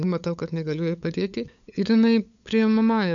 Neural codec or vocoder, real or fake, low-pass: codec, 16 kHz, 8 kbps, FunCodec, trained on LibriTTS, 25 frames a second; fake; 7.2 kHz